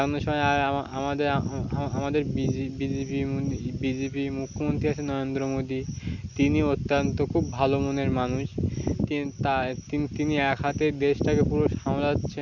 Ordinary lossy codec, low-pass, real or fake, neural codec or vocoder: none; 7.2 kHz; real; none